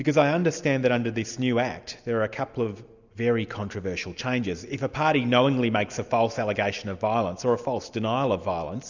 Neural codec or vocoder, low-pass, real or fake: none; 7.2 kHz; real